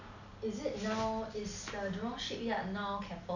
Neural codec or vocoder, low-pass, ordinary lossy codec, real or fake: none; 7.2 kHz; none; real